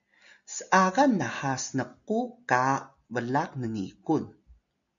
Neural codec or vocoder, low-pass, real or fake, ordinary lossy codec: none; 7.2 kHz; real; MP3, 96 kbps